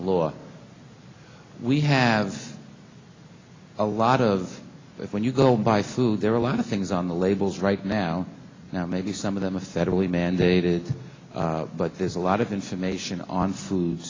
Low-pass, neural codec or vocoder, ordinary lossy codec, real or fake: 7.2 kHz; none; AAC, 32 kbps; real